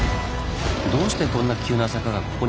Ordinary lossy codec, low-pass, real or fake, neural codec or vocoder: none; none; real; none